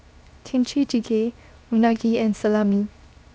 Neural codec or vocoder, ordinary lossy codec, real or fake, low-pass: codec, 16 kHz, 0.7 kbps, FocalCodec; none; fake; none